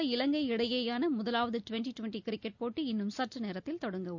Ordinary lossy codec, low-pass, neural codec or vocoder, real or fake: none; 7.2 kHz; none; real